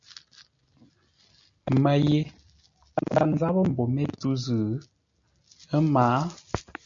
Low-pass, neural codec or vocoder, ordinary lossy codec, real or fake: 7.2 kHz; none; MP3, 64 kbps; real